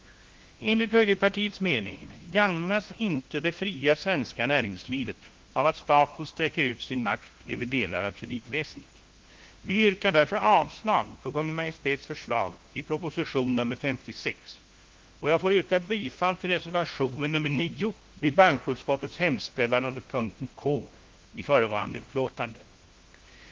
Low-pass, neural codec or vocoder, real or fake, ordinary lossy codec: 7.2 kHz; codec, 16 kHz, 1 kbps, FunCodec, trained on LibriTTS, 50 frames a second; fake; Opus, 16 kbps